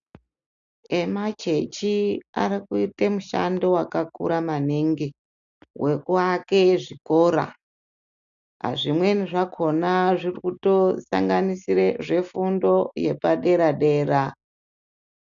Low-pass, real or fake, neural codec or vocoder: 7.2 kHz; real; none